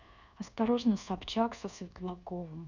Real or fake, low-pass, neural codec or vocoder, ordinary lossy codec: fake; 7.2 kHz; codec, 24 kHz, 1.2 kbps, DualCodec; none